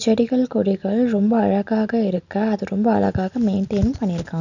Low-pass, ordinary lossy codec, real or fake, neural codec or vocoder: 7.2 kHz; none; real; none